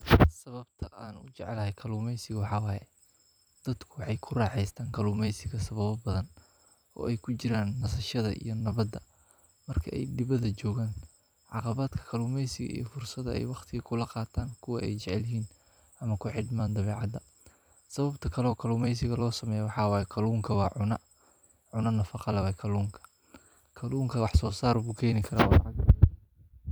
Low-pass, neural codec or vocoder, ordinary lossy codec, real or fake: none; vocoder, 44.1 kHz, 128 mel bands every 256 samples, BigVGAN v2; none; fake